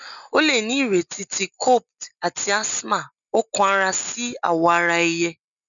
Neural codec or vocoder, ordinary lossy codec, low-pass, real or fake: none; none; 7.2 kHz; real